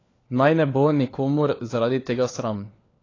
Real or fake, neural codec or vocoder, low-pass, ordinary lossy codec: fake; codec, 16 kHz, 4 kbps, FunCodec, trained on LibriTTS, 50 frames a second; 7.2 kHz; AAC, 32 kbps